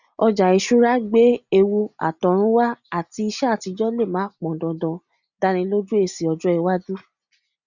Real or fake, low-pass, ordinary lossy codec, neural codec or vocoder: real; 7.2 kHz; none; none